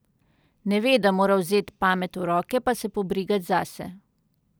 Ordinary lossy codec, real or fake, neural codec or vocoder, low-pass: none; real; none; none